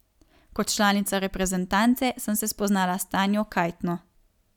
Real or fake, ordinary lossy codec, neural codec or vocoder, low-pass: real; none; none; 19.8 kHz